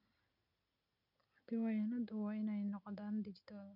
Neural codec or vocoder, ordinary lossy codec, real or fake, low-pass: none; none; real; 5.4 kHz